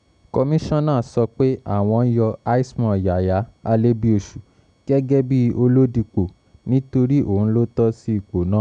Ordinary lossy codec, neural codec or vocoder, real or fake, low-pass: none; none; real; 9.9 kHz